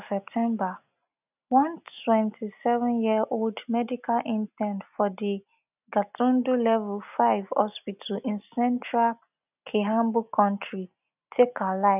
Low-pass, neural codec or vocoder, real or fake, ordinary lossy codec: 3.6 kHz; none; real; none